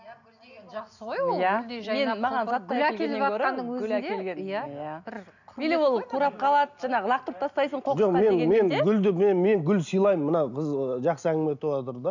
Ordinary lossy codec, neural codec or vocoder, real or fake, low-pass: none; none; real; 7.2 kHz